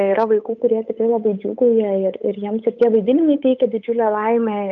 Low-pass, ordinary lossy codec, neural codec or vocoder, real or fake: 7.2 kHz; MP3, 64 kbps; codec, 16 kHz, 8 kbps, FunCodec, trained on Chinese and English, 25 frames a second; fake